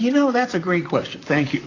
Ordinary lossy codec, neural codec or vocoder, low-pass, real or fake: AAC, 32 kbps; vocoder, 44.1 kHz, 128 mel bands, Pupu-Vocoder; 7.2 kHz; fake